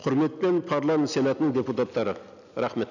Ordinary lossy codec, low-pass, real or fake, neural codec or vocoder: none; 7.2 kHz; real; none